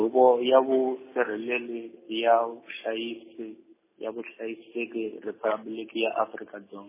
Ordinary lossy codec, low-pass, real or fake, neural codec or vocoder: MP3, 16 kbps; 3.6 kHz; real; none